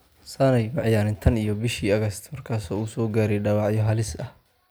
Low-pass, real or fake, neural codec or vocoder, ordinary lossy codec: none; real; none; none